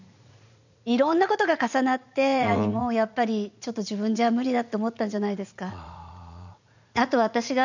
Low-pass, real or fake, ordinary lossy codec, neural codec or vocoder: 7.2 kHz; fake; none; vocoder, 44.1 kHz, 80 mel bands, Vocos